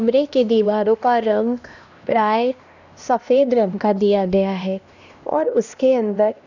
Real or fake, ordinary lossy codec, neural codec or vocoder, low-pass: fake; none; codec, 16 kHz, 1 kbps, X-Codec, HuBERT features, trained on LibriSpeech; 7.2 kHz